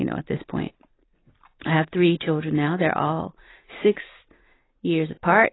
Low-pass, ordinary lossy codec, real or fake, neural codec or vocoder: 7.2 kHz; AAC, 16 kbps; real; none